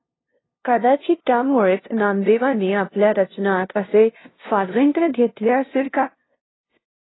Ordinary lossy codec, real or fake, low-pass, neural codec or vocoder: AAC, 16 kbps; fake; 7.2 kHz; codec, 16 kHz, 0.5 kbps, FunCodec, trained on LibriTTS, 25 frames a second